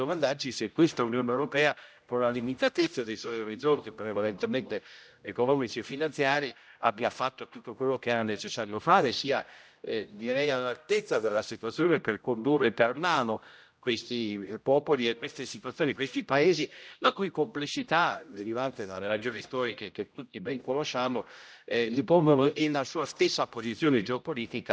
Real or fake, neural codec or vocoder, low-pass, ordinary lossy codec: fake; codec, 16 kHz, 0.5 kbps, X-Codec, HuBERT features, trained on general audio; none; none